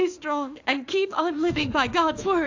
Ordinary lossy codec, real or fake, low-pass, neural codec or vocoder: AAC, 48 kbps; fake; 7.2 kHz; autoencoder, 48 kHz, 32 numbers a frame, DAC-VAE, trained on Japanese speech